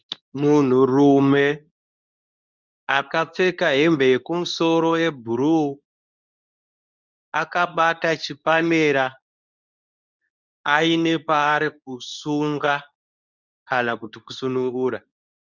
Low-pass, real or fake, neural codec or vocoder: 7.2 kHz; fake; codec, 24 kHz, 0.9 kbps, WavTokenizer, medium speech release version 2